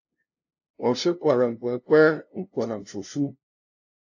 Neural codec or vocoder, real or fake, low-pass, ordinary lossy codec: codec, 16 kHz, 0.5 kbps, FunCodec, trained on LibriTTS, 25 frames a second; fake; 7.2 kHz; AAC, 48 kbps